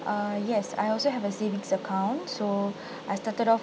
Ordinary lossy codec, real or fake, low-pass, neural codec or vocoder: none; real; none; none